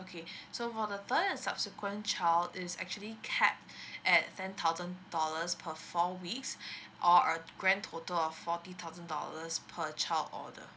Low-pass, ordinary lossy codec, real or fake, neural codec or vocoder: none; none; real; none